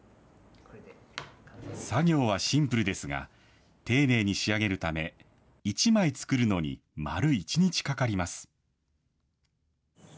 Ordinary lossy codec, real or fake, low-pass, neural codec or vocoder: none; real; none; none